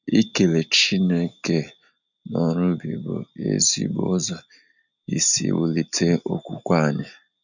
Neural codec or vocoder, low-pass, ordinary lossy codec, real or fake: none; 7.2 kHz; none; real